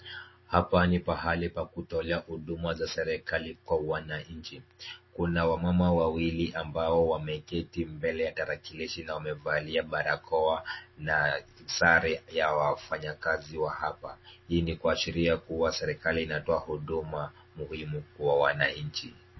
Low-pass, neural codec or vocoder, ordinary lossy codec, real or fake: 7.2 kHz; none; MP3, 24 kbps; real